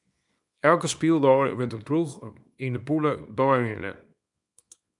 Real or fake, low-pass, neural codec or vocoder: fake; 10.8 kHz; codec, 24 kHz, 0.9 kbps, WavTokenizer, small release